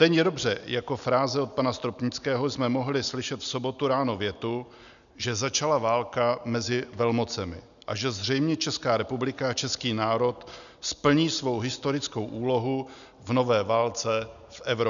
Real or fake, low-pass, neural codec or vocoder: real; 7.2 kHz; none